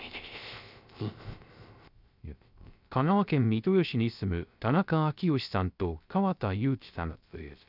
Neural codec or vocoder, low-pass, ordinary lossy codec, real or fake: codec, 16 kHz, 0.3 kbps, FocalCodec; 5.4 kHz; none; fake